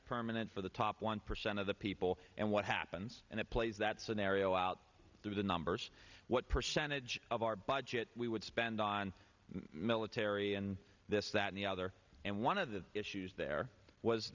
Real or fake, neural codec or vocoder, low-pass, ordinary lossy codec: real; none; 7.2 kHz; Opus, 64 kbps